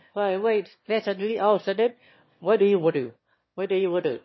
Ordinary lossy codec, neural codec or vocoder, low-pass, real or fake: MP3, 24 kbps; autoencoder, 22.05 kHz, a latent of 192 numbers a frame, VITS, trained on one speaker; 7.2 kHz; fake